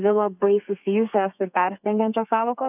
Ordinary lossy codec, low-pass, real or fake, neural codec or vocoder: AAC, 32 kbps; 3.6 kHz; fake; codec, 32 kHz, 1.9 kbps, SNAC